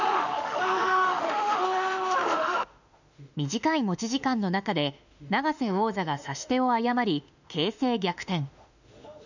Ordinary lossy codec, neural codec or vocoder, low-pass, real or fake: none; autoencoder, 48 kHz, 32 numbers a frame, DAC-VAE, trained on Japanese speech; 7.2 kHz; fake